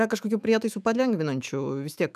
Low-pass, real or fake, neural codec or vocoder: 14.4 kHz; fake; autoencoder, 48 kHz, 128 numbers a frame, DAC-VAE, trained on Japanese speech